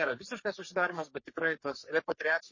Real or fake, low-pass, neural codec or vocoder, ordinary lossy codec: fake; 7.2 kHz; codec, 44.1 kHz, 3.4 kbps, Pupu-Codec; MP3, 32 kbps